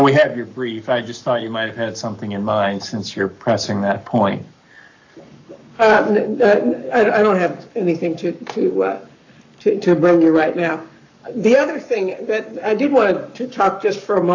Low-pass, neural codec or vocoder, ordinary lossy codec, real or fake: 7.2 kHz; codec, 44.1 kHz, 7.8 kbps, Pupu-Codec; AAC, 48 kbps; fake